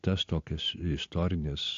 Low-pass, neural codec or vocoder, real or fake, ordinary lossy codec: 7.2 kHz; none; real; AAC, 48 kbps